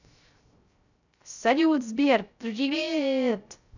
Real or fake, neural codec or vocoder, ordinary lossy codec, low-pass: fake; codec, 16 kHz, 0.3 kbps, FocalCodec; none; 7.2 kHz